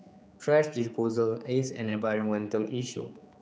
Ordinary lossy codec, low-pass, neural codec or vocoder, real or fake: none; none; codec, 16 kHz, 4 kbps, X-Codec, HuBERT features, trained on general audio; fake